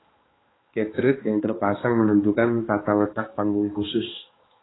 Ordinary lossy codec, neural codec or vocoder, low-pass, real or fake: AAC, 16 kbps; codec, 16 kHz, 2 kbps, X-Codec, HuBERT features, trained on balanced general audio; 7.2 kHz; fake